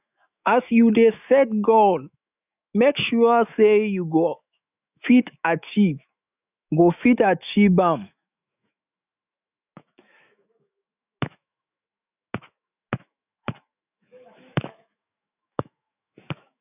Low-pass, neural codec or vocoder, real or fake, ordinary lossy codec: 3.6 kHz; autoencoder, 48 kHz, 128 numbers a frame, DAC-VAE, trained on Japanese speech; fake; none